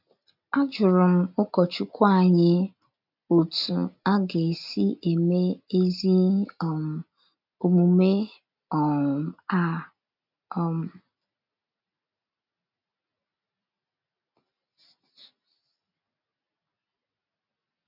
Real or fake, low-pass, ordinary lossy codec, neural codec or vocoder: real; 5.4 kHz; none; none